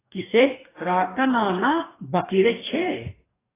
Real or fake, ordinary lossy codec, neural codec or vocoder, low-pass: fake; AAC, 16 kbps; codec, 44.1 kHz, 2.6 kbps, DAC; 3.6 kHz